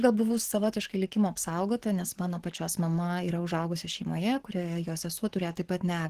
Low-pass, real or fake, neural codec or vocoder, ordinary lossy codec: 14.4 kHz; fake; codec, 44.1 kHz, 7.8 kbps, DAC; Opus, 16 kbps